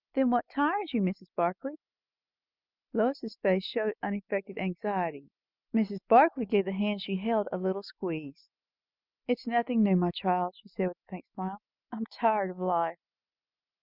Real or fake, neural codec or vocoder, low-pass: real; none; 5.4 kHz